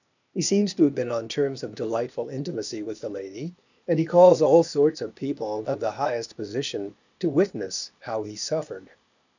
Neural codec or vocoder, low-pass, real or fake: codec, 16 kHz, 0.8 kbps, ZipCodec; 7.2 kHz; fake